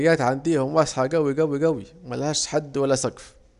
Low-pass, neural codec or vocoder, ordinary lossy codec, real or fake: 10.8 kHz; none; none; real